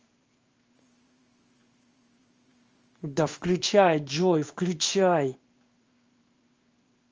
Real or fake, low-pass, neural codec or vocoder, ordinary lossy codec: fake; 7.2 kHz; codec, 16 kHz in and 24 kHz out, 1 kbps, XY-Tokenizer; Opus, 24 kbps